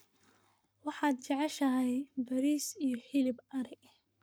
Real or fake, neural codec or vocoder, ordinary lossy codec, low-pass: fake; codec, 44.1 kHz, 7.8 kbps, Pupu-Codec; none; none